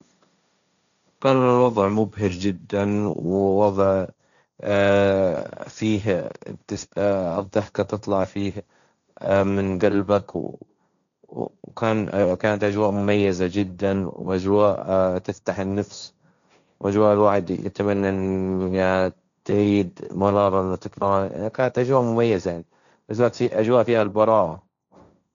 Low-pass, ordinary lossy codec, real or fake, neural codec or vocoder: 7.2 kHz; none; fake; codec, 16 kHz, 1.1 kbps, Voila-Tokenizer